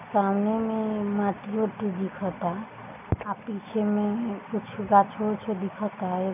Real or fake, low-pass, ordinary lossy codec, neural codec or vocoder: real; 3.6 kHz; none; none